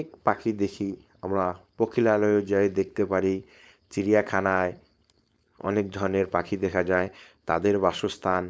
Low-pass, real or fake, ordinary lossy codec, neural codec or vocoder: none; fake; none; codec, 16 kHz, 4.8 kbps, FACodec